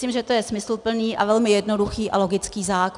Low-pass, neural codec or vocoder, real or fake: 10.8 kHz; none; real